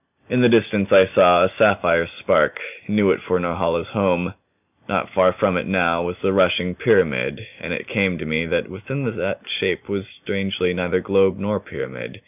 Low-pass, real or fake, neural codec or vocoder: 3.6 kHz; real; none